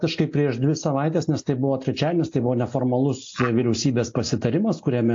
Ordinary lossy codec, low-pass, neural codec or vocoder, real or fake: AAC, 48 kbps; 7.2 kHz; none; real